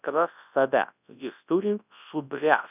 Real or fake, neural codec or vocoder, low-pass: fake; codec, 24 kHz, 0.9 kbps, WavTokenizer, large speech release; 3.6 kHz